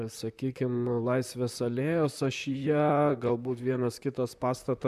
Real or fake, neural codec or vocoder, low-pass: fake; vocoder, 44.1 kHz, 128 mel bands, Pupu-Vocoder; 14.4 kHz